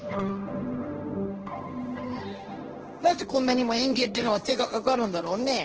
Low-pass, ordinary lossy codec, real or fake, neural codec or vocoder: 7.2 kHz; Opus, 16 kbps; fake; codec, 16 kHz, 1.1 kbps, Voila-Tokenizer